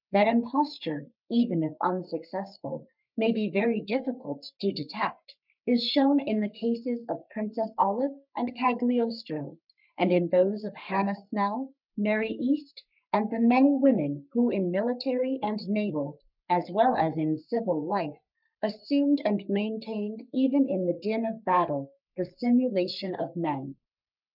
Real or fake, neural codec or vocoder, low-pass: fake; codec, 44.1 kHz, 3.4 kbps, Pupu-Codec; 5.4 kHz